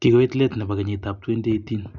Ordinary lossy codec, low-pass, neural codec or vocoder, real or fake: none; 7.2 kHz; none; real